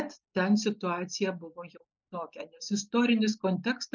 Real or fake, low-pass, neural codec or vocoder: real; 7.2 kHz; none